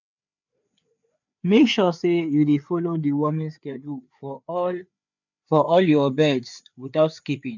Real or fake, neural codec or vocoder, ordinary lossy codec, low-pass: fake; codec, 16 kHz, 4 kbps, FreqCodec, larger model; none; 7.2 kHz